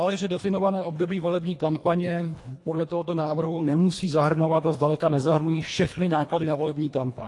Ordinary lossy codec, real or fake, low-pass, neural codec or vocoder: AAC, 48 kbps; fake; 10.8 kHz; codec, 24 kHz, 1.5 kbps, HILCodec